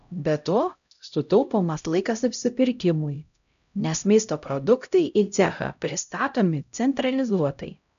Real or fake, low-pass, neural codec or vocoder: fake; 7.2 kHz; codec, 16 kHz, 0.5 kbps, X-Codec, HuBERT features, trained on LibriSpeech